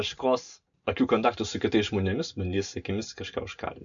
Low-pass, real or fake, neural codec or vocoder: 7.2 kHz; real; none